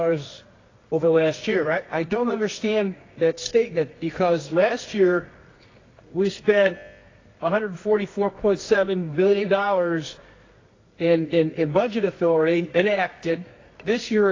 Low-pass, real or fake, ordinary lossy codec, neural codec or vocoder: 7.2 kHz; fake; AAC, 32 kbps; codec, 24 kHz, 0.9 kbps, WavTokenizer, medium music audio release